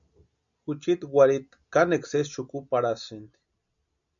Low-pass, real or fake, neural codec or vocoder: 7.2 kHz; real; none